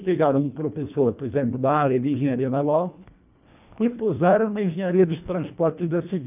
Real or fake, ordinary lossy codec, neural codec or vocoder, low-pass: fake; none; codec, 24 kHz, 1.5 kbps, HILCodec; 3.6 kHz